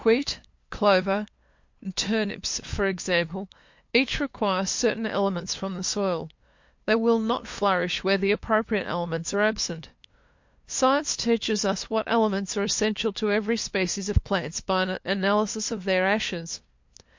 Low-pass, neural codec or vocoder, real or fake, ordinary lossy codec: 7.2 kHz; codec, 16 kHz, 2 kbps, FunCodec, trained on LibriTTS, 25 frames a second; fake; MP3, 48 kbps